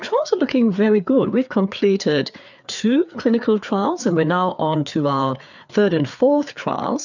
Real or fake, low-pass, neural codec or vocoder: fake; 7.2 kHz; codec, 16 kHz, 4 kbps, FreqCodec, larger model